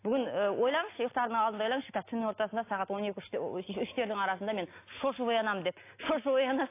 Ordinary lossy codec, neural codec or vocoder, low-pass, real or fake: AAC, 24 kbps; none; 3.6 kHz; real